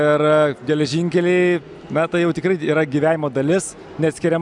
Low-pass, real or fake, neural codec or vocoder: 10.8 kHz; real; none